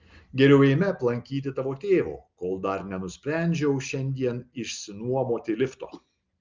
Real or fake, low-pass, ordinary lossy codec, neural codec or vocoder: real; 7.2 kHz; Opus, 32 kbps; none